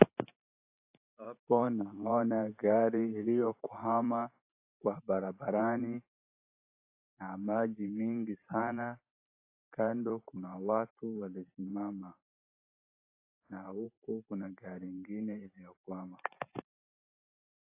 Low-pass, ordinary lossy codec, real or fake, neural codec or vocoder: 3.6 kHz; AAC, 24 kbps; fake; vocoder, 44.1 kHz, 128 mel bands every 512 samples, BigVGAN v2